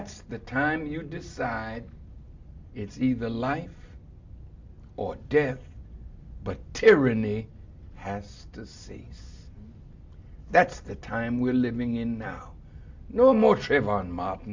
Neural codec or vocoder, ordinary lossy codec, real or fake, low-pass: none; MP3, 64 kbps; real; 7.2 kHz